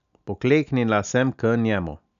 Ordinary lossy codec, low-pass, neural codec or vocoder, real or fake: none; 7.2 kHz; none; real